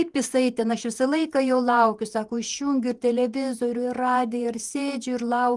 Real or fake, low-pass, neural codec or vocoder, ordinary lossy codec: fake; 10.8 kHz; vocoder, 48 kHz, 128 mel bands, Vocos; Opus, 24 kbps